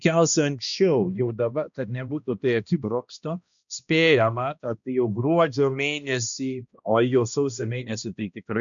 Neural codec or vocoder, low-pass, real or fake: codec, 16 kHz, 1 kbps, X-Codec, HuBERT features, trained on balanced general audio; 7.2 kHz; fake